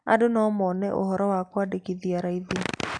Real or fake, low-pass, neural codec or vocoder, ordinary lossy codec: real; 9.9 kHz; none; none